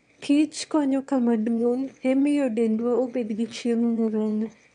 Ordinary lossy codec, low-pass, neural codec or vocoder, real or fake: none; 9.9 kHz; autoencoder, 22.05 kHz, a latent of 192 numbers a frame, VITS, trained on one speaker; fake